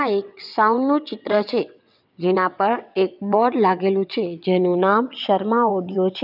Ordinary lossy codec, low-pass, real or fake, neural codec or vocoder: none; 5.4 kHz; fake; vocoder, 22.05 kHz, 80 mel bands, WaveNeXt